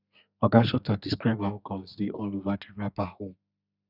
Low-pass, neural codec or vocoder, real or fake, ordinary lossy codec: 5.4 kHz; codec, 32 kHz, 1.9 kbps, SNAC; fake; none